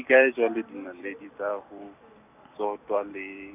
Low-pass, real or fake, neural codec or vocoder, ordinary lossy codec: 3.6 kHz; fake; vocoder, 44.1 kHz, 128 mel bands every 256 samples, BigVGAN v2; none